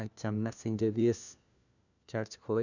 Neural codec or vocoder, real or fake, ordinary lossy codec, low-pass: codec, 16 kHz, 1 kbps, FunCodec, trained on LibriTTS, 50 frames a second; fake; none; 7.2 kHz